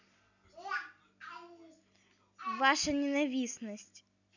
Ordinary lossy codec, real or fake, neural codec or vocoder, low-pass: none; real; none; 7.2 kHz